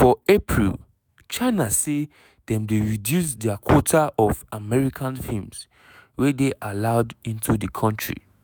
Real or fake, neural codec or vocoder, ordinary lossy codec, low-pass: fake; autoencoder, 48 kHz, 128 numbers a frame, DAC-VAE, trained on Japanese speech; none; none